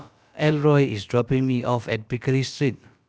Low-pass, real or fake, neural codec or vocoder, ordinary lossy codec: none; fake; codec, 16 kHz, about 1 kbps, DyCAST, with the encoder's durations; none